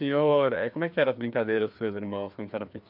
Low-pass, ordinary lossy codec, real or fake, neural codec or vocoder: 5.4 kHz; MP3, 48 kbps; fake; codec, 16 kHz, 2 kbps, FreqCodec, larger model